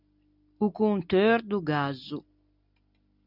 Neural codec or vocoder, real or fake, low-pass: none; real; 5.4 kHz